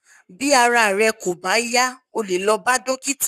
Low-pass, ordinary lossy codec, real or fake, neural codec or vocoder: 14.4 kHz; none; fake; codec, 44.1 kHz, 7.8 kbps, Pupu-Codec